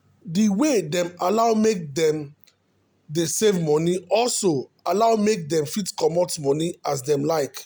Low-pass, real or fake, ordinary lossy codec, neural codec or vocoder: none; real; none; none